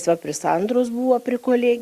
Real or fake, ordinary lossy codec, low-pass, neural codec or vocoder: real; Opus, 64 kbps; 14.4 kHz; none